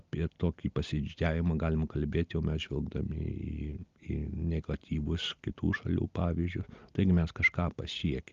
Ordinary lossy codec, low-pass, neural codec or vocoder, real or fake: Opus, 24 kbps; 7.2 kHz; none; real